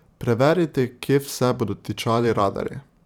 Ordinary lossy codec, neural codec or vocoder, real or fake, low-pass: none; vocoder, 44.1 kHz, 128 mel bands every 512 samples, BigVGAN v2; fake; 19.8 kHz